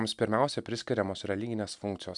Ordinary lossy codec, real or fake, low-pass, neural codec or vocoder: MP3, 96 kbps; real; 10.8 kHz; none